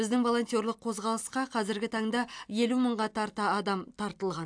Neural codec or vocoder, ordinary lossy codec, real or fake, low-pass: none; AAC, 64 kbps; real; 9.9 kHz